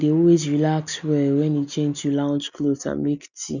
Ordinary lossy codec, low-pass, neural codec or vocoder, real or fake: none; 7.2 kHz; none; real